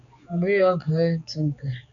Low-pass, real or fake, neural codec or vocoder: 7.2 kHz; fake; codec, 16 kHz, 4 kbps, X-Codec, HuBERT features, trained on general audio